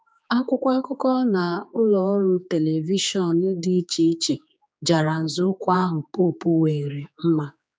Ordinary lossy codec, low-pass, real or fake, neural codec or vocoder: none; none; fake; codec, 16 kHz, 4 kbps, X-Codec, HuBERT features, trained on general audio